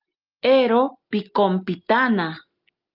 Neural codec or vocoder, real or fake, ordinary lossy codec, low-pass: none; real; Opus, 24 kbps; 5.4 kHz